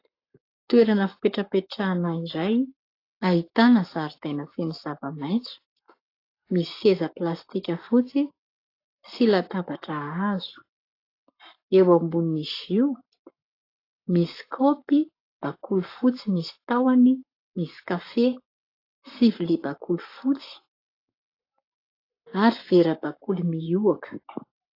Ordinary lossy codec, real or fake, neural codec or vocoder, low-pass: AAC, 32 kbps; fake; codec, 44.1 kHz, 7.8 kbps, Pupu-Codec; 5.4 kHz